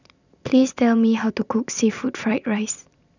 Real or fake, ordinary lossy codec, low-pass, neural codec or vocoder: fake; none; 7.2 kHz; vocoder, 44.1 kHz, 128 mel bands every 256 samples, BigVGAN v2